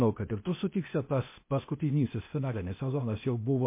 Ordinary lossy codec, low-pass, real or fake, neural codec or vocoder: MP3, 24 kbps; 3.6 kHz; fake; codec, 16 kHz, 0.8 kbps, ZipCodec